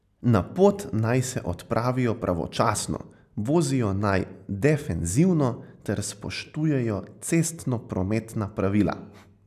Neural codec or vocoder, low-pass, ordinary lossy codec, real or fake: none; 14.4 kHz; none; real